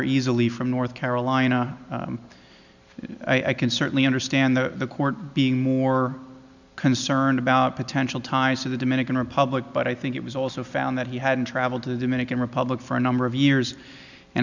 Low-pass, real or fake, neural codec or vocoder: 7.2 kHz; real; none